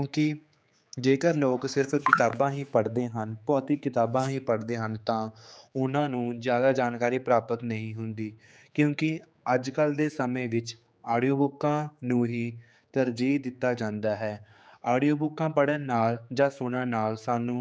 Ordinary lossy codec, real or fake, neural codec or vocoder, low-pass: none; fake; codec, 16 kHz, 4 kbps, X-Codec, HuBERT features, trained on general audio; none